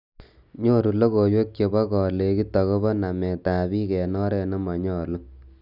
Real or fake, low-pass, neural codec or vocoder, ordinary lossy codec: real; 5.4 kHz; none; none